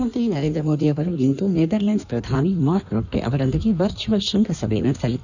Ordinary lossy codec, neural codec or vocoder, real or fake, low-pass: none; codec, 16 kHz in and 24 kHz out, 1.1 kbps, FireRedTTS-2 codec; fake; 7.2 kHz